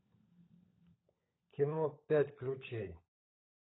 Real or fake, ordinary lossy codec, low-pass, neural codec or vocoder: fake; AAC, 16 kbps; 7.2 kHz; codec, 16 kHz, 16 kbps, FunCodec, trained on LibriTTS, 50 frames a second